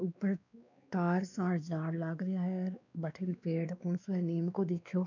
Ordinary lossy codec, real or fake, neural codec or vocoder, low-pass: none; fake; codec, 16 kHz, 2 kbps, X-Codec, WavLM features, trained on Multilingual LibriSpeech; 7.2 kHz